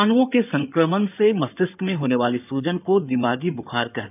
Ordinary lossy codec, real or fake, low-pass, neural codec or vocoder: none; fake; 3.6 kHz; codec, 16 kHz in and 24 kHz out, 2.2 kbps, FireRedTTS-2 codec